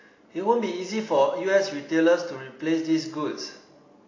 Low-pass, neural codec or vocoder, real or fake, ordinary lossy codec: 7.2 kHz; none; real; AAC, 32 kbps